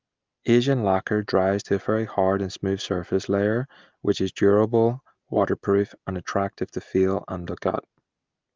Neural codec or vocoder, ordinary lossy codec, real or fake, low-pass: none; Opus, 32 kbps; real; 7.2 kHz